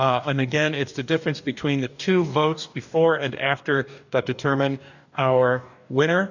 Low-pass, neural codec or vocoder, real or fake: 7.2 kHz; codec, 44.1 kHz, 2.6 kbps, DAC; fake